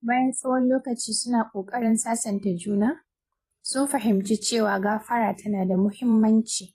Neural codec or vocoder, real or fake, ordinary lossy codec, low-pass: vocoder, 44.1 kHz, 128 mel bands every 512 samples, BigVGAN v2; fake; AAC, 48 kbps; 14.4 kHz